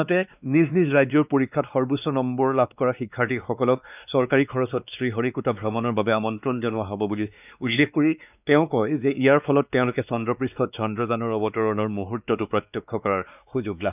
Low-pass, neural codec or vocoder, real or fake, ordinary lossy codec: 3.6 kHz; codec, 16 kHz, 2 kbps, X-Codec, WavLM features, trained on Multilingual LibriSpeech; fake; none